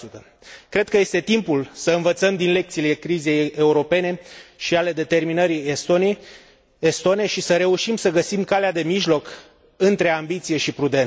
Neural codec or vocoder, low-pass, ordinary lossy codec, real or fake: none; none; none; real